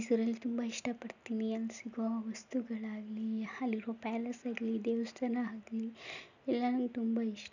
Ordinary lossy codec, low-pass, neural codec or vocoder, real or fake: none; 7.2 kHz; none; real